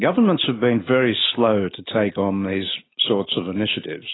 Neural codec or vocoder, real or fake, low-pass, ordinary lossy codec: none; real; 7.2 kHz; AAC, 16 kbps